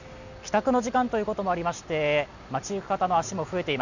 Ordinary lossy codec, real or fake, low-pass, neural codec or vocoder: none; real; 7.2 kHz; none